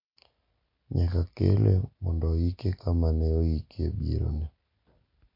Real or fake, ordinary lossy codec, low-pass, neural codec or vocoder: real; MP3, 32 kbps; 5.4 kHz; none